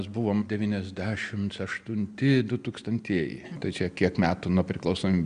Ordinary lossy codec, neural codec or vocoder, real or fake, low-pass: AAC, 96 kbps; none; real; 9.9 kHz